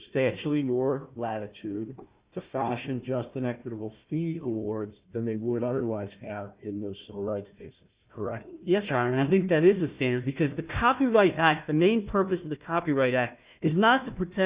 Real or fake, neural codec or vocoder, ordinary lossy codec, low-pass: fake; codec, 16 kHz, 1 kbps, FunCodec, trained on Chinese and English, 50 frames a second; Opus, 64 kbps; 3.6 kHz